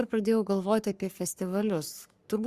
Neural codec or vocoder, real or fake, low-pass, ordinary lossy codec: codec, 44.1 kHz, 3.4 kbps, Pupu-Codec; fake; 14.4 kHz; Opus, 64 kbps